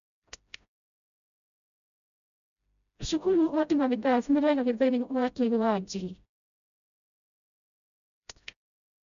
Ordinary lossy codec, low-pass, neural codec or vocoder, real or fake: none; 7.2 kHz; codec, 16 kHz, 0.5 kbps, FreqCodec, smaller model; fake